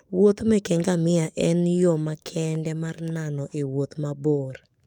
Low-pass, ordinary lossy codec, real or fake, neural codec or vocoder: 19.8 kHz; none; fake; codec, 44.1 kHz, 7.8 kbps, DAC